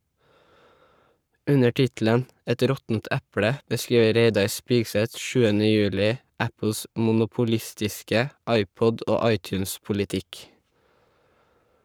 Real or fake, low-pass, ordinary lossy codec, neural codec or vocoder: fake; none; none; codec, 44.1 kHz, 7.8 kbps, Pupu-Codec